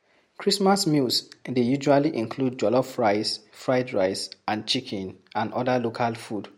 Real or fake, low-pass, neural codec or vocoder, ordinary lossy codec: real; 19.8 kHz; none; MP3, 64 kbps